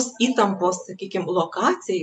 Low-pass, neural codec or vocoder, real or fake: 10.8 kHz; none; real